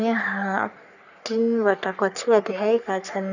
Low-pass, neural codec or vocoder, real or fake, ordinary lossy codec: 7.2 kHz; codec, 44.1 kHz, 3.4 kbps, Pupu-Codec; fake; none